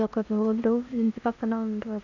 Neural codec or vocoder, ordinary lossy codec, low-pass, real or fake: codec, 16 kHz in and 24 kHz out, 0.8 kbps, FocalCodec, streaming, 65536 codes; none; 7.2 kHz; fake